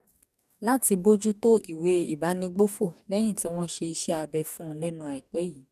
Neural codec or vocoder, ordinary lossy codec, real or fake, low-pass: codec, 44.1 kHz, 2.6 kbps, DAC; none; fake; 14.4 kHz